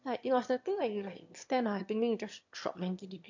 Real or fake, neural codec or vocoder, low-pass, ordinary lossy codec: fake; autoencoder, 22.05 kHz, a latent of 192 numbers a frame, VITS, trained on one speaker; 7.2 kHz; MP3, 48 kbps